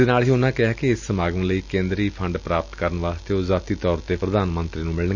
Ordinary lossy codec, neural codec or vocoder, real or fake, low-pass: none; none; real; 7.2 kHz